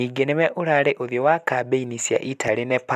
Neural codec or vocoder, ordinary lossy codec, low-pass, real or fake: none; none; 14.4 kHz; real